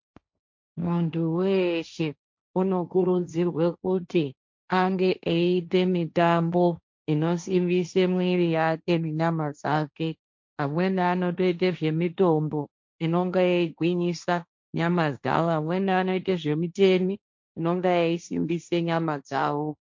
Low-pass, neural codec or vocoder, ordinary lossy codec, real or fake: 7.2 kHz; codec, 16 kHz, 1.1 kbps, Voila-Tokenizer; MP3, 48 kbps; fake